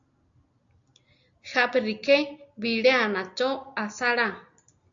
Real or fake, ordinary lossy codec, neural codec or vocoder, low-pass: real; AAC, 64 kbps; none; 7.2 kHz